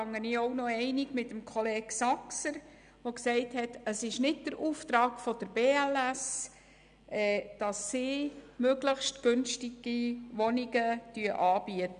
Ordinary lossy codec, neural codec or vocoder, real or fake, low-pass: none; none; real; 9.9 kHz